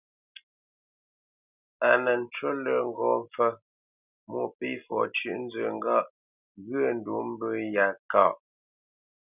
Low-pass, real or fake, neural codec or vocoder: 3.6 kHz; real; none